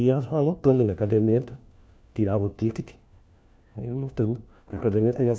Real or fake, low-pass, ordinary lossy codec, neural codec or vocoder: fake; none; none; codec, 16 kHz, 1 kbps, FunCodec, trained on LibriTTS, 50 frames a second